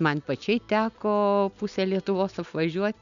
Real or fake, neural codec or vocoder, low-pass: real; none; 7.2 kHz